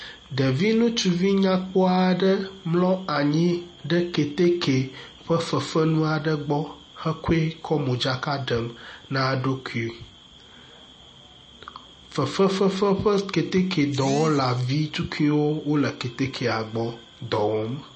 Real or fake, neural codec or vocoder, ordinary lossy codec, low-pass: real; none; MP3, 32 kbps; 10.8 kHz